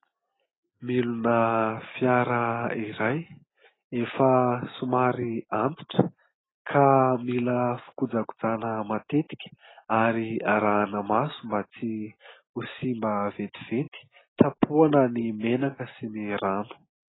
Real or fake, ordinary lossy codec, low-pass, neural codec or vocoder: real; AAC, 16 kbps; 7.2 kHz; none